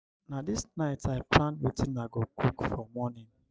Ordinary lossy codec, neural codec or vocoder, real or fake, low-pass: none; none; real; none